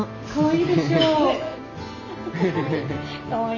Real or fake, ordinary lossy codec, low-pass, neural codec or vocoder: real; none; 7.2 kHz; none